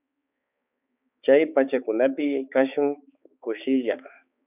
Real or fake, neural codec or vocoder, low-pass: fake; codec, 16 kHz, 4 kbps, X-Codec, HuBERT features, trained on balanced general audio; 3.6 kHz